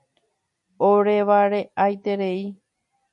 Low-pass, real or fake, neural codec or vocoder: 10.8 kHz; fake; vocoder, 44.1 kHz, 128 mel bands every 256 samples, BigVGAN v2